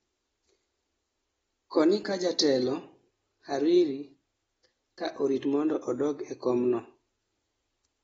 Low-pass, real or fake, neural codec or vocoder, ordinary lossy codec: 19.8 kHz; real; none; AAC, 24 kbps